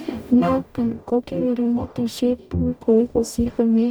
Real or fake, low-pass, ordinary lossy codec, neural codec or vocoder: fake; none; none; codec, 44.1 kHz, 0.9 kbps, DAC